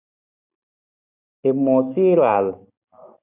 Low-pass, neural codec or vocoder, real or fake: 3.6 kHz; none; real